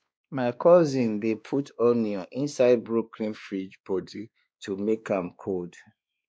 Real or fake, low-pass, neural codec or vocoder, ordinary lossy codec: fake; none; codec, 16 kHz, 2 kbps, X-Codec, WavLM features, trained on Multilingual LibriSpeech; none